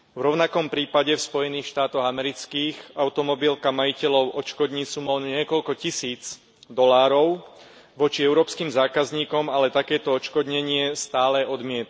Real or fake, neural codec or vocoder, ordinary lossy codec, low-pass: real; none; none; none